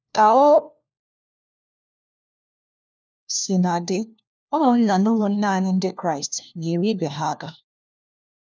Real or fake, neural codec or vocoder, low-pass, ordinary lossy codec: fake; codec, 16 kHz, 1 kbps, FunCodec, trained on LibriTTS, 50 frames a second; none; none